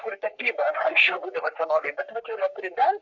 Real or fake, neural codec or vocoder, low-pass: fake; codec, 44.1 kHz, 3.4 kbps, Pupu-Codec; 7.2 kHz